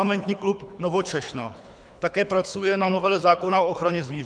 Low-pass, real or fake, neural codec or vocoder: 9.9 kHz; fake; codec, 24 kHz, 3 kbps, HILCodec